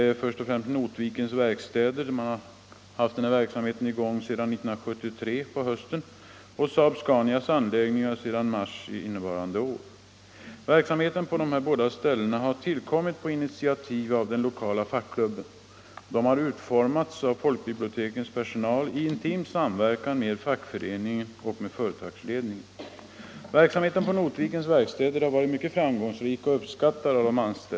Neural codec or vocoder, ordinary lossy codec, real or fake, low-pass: none; none; real; none